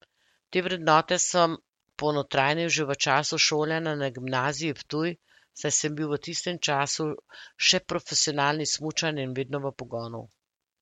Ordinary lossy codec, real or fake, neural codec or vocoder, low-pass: MP3, 64 kbps; real; none; 19.8 kHz